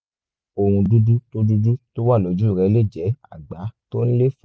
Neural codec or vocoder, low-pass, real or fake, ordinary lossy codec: none; none; real; none